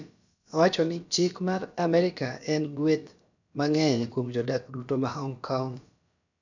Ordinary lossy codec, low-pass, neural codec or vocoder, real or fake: none; 7.2 kHz; codec, 16 kHz, about 1 kbps, DyCAST, with the encoder's durations; fake